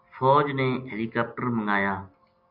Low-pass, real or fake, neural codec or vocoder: 5.4 kHz; real; none